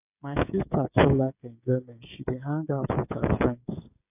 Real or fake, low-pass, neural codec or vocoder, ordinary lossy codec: fake; 3.6 kHz; codec, 16 kHz, 16 kbps, FreqCodec, smaller model; AAC, 32 kbps